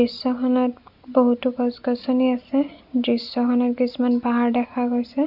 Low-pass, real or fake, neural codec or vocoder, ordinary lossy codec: 5.4 kHz; real; none; none